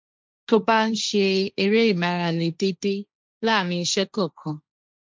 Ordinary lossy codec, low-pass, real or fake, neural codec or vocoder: none; none; fake; codec, 16 kHz, 1.1 kbps, Voila-Tokenizer